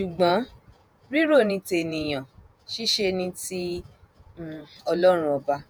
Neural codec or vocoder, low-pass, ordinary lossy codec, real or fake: vocoder, 48 kHz, 128 mel bands, Vocos; none; none; fake